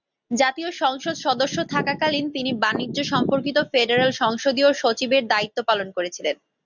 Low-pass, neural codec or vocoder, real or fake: 7.2 kHz; none; real